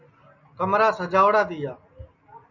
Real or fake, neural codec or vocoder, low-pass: real; none; 7.2 kHz